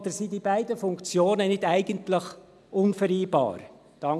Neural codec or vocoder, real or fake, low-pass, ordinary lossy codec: none; real; none; none